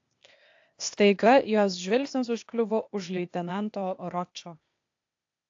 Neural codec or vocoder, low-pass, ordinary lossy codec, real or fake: codec, 16 kHz, 0.8 kbps, ZipCodec; 7.2 kHz; AAC, 48 kbps; fake